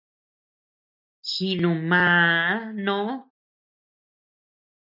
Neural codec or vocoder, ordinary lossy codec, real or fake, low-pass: none; MP3, 48 kbps; real; 5.4 kHz